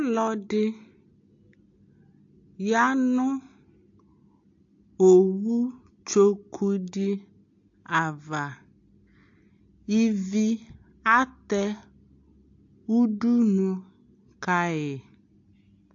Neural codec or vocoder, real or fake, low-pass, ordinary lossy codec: none; real; 7.2 kHz; AAC, 48 kbps